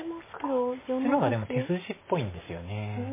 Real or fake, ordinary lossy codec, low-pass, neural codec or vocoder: real; MP3, 16 kbps; 3.6 kHz; none